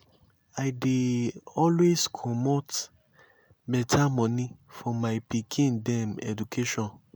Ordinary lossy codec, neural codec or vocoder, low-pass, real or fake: none; none; none; real